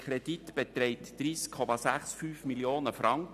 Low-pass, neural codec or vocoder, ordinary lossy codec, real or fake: 14.4 kHz; none; none; real